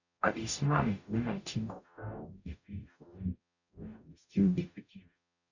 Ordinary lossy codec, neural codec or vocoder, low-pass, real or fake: none; codec, 44.1 kHz, 0.9 kbps, DAC; 7.2 kHz; fake